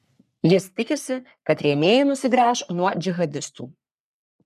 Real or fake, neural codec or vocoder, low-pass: fake; codec, 44.1 kHz, 3.4 kbps, Pupu-Codec; 14.4 kHz